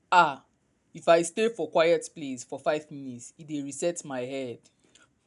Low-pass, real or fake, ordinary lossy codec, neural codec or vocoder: 10.8 kHz; real; none; none